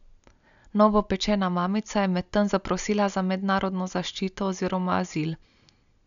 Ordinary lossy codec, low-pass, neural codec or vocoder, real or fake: none; 7.2 kHz; none; real